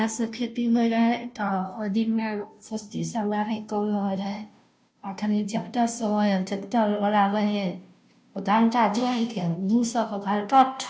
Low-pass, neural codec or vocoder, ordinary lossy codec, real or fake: none; codec, 16 kHz, 0.5 kbps, FunCodec, trained on Chinese and English, 25 frames a second; none; fake